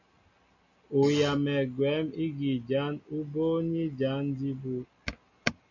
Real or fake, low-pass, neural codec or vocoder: real; 7.2 kHz; none